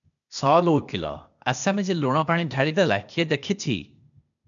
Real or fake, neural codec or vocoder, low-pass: fake; codec, 16 kHz, 0.8 kbps, ZipCodec; 7.2 kHz